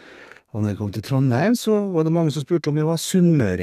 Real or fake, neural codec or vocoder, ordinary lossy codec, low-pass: fake; codec, 32 kHz, 1.9 kbps, SNAC; none; 14.4 kHz